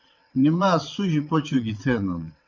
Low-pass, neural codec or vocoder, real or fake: 7.2 kHz; vocoder, 22.05 kHz, 80 mel bands, WaveNeXt; fake